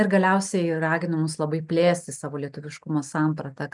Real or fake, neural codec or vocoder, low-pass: real; none; 10.8 kHz